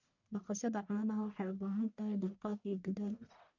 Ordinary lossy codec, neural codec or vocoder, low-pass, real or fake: none; codec, 44.1 kHz, 1.7 kbps, Pupu-Codec; 7.2 kHz; fake